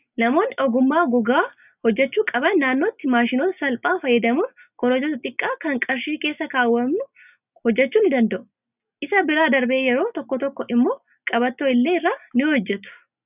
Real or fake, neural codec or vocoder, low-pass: real; none; 3.6 kHz